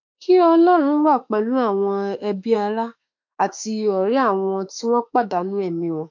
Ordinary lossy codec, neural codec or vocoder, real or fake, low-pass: MP3, 48 kbps; autoencoder, 48 kHz, 32 numbers a frame, DAC-VAE, trained on Japanese speech; fake; 7.2 kHz